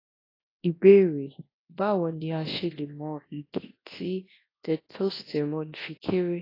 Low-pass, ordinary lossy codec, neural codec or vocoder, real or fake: 5.4 kHz; AAC, 24 kbps; codec, 24 kHz, 0.9 kbps, WavTokenizer, large speech release; fake